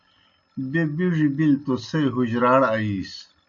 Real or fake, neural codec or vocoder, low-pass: real; none; 7.2 kHz